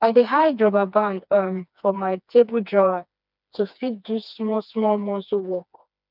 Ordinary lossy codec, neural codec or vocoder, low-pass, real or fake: none; codec, 16 kHz, 2 kbps, FreqCodec, smaller model; 5.4 kHz; fake